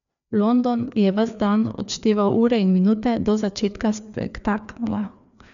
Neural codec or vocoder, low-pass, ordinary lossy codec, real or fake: codec, 16 kHz, 2 kbps, FreqCodec, larger model; 7.2 kHz; none; fake